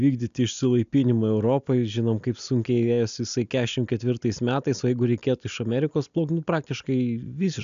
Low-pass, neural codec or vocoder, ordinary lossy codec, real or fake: 7.2 kHz; none; AAC, 96 kbps; real